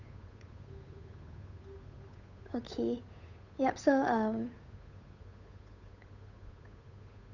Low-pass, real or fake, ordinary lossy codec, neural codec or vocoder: 7.2 kHz; fake; none; codec, 16 kHz, 8 kbps, FunCodec, trained on Chinese and English, 25 frames a second